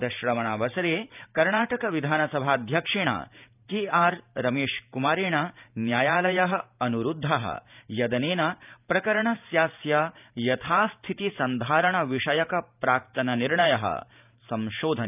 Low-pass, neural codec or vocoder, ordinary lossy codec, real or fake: 3.6 kHz; vocoder, 44.1 kHz, 128 mel bands every 512 samples, BigVGAN v2; none; fake